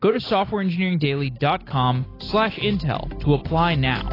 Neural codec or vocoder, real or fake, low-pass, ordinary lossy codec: none; real; 5.4 kHz; AAC, 24 kbps